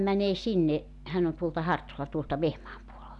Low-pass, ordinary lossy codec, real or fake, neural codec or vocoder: 10.8 kHz; none; real; none